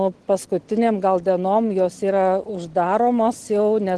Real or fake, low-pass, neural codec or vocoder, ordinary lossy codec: real; 10.8 kHz; none; Opus, 16 kbps